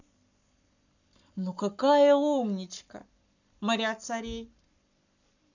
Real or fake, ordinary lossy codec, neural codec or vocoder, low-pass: fake; none; codec, 44.1 kHz, 7.8 kbps, Pupu-Codec; 7.2 kHz